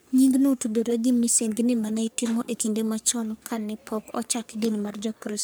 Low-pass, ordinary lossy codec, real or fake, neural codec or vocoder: none; none; fake; codec, 44.1 kHz, 3.4 kbps, Pupu-Codec